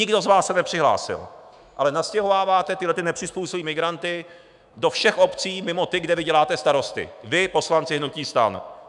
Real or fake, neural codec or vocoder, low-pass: fake; autoencoder, 48 kHz, 128 numbers a frame, DAC-VAE, trained on Japanese speech; 10.8 kHz